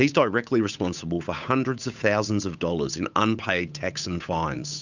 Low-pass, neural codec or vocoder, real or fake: 7.2 kHz; codec, 16 kHz, 8 kbps, FunCodec, trained on Chinese and English, 25 frames a second; fake